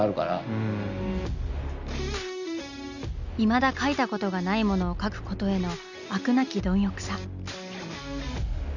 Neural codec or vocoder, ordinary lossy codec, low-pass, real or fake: none; none; 7.2 kHz; real